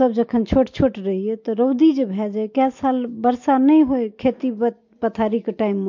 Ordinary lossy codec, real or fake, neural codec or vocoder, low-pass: MP3, 48 kbps; real; none; 7.2 kHz